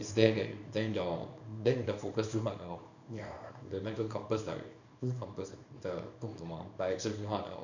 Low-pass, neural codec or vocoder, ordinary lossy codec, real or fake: 7.2 kHz; codec, 24 kHz, 0.9 kbps, WavTokenizer, small release; none; fake